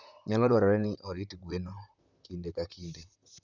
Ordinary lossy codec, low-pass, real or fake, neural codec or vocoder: none; 7.2 kHz; fake; vocoder, 44.1 kHz, 128 mel bands, Pupu-Vocoder